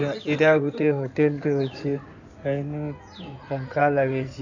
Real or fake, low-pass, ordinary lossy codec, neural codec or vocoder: fake; 7.2 kHz; none; codec, 16 kHz in and 24 kHz out, 2.2 kbps, FireRedTTS-2 codec